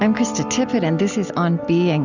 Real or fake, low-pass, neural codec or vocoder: real; 7.2 kHz; none